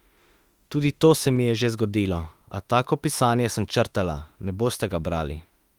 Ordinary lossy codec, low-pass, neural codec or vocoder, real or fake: Opus, 32 kbps; 19.8 kHz; autoencoder, 48 kHz, 32 numbers a frame, DAC-VAE, trained on Japanese speech; fake